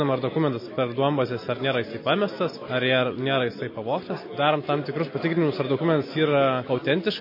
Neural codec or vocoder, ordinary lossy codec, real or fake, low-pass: none; MP3, 24 kbps; real; 5.4 kHz